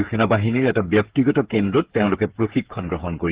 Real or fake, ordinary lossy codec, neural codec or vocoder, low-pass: fake; Opus, 16 kbps; codec, 16 kHz, 8 kbps, FreqCodec, smaller model; 3.6 kHz